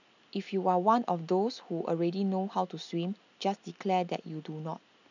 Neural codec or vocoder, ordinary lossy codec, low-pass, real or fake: none; none; 7.2 kHz; real